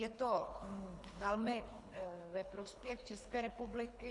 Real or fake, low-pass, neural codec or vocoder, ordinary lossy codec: fake; 10.8 kHz; codec, 24 kHz, 3 kbps, HILCodec; AAC, 64 kbps